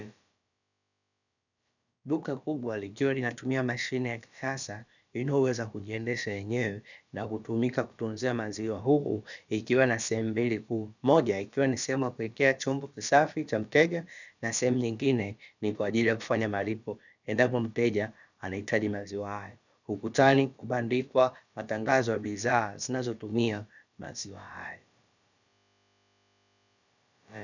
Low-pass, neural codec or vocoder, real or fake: 7.2 kHz; codec, 16 kHz, about 1 kbps, DyCAST, with the encoder's durations; fake